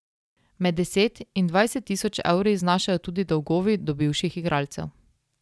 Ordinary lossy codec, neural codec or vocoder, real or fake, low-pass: none; none; real; none